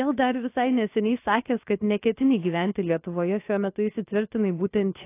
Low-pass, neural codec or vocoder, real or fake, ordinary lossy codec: 3.6 kHz; codec, 16 kHz, about 1 kbps, DyCAST, with the encoder's durations; fake; AAC, 24 kbps